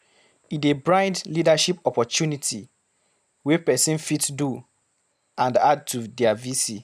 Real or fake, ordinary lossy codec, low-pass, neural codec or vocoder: real; none; 14.4 kHz; none